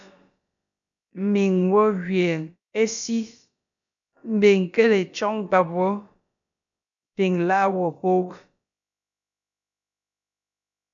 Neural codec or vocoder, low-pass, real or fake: codec, 16 kHz, about 1 kbps, DyCAST, with the encoder's durations; 7.2 kHz; fake